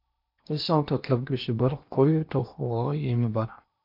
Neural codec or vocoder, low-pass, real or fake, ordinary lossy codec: codec, 16 kHz in and 24 kHz out, 0.8 kbps, FocalCodec, streaming, 65536 codes; 5.4 kHz; fake; MP3, 48 kbps